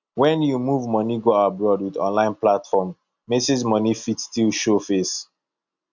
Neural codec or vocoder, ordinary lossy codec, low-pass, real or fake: none; none; 7.2 kHz; real